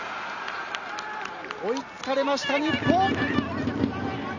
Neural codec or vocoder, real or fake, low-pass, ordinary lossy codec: none; real; 7.2 kHz; none